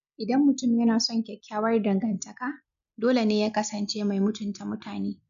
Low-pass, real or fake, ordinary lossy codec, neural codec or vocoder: 7.2 kHz; real; none; none